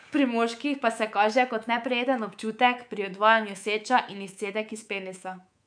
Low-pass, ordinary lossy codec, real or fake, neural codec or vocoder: 9.9 kHz; none; fake; codec, 24 kHz, 3.1 kbps, DualCodec